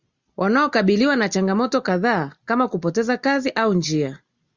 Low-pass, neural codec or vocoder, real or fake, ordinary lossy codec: 7.2 kHz; none; real; Opus, 64 kbps